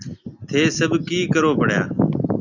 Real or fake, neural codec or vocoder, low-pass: real; none; 7.2 kHz